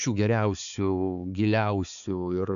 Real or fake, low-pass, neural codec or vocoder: fake; 7.2 kHz; codec, 16 kHz, 4 kbps, X-Codec, HuBERT features, trained on balanced general audio